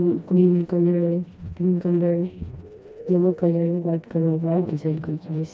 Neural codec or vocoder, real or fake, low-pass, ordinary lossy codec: codec, 16 kHz, 1 kbps, FreqCodec, smaller model; fake; none; none